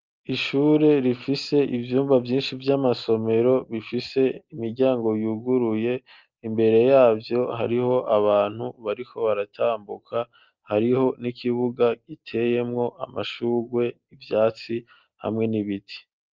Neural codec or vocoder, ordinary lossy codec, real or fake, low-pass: none; Opus, 24 kbps; real; 7.2 kHz